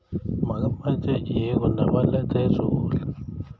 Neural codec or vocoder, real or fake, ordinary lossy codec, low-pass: none; real; none; none